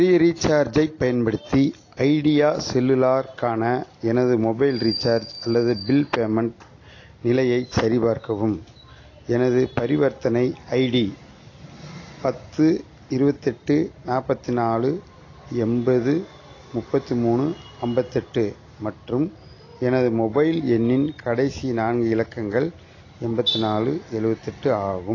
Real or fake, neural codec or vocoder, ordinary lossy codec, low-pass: real; none; AAC, 32 kbps; 7.2 kHz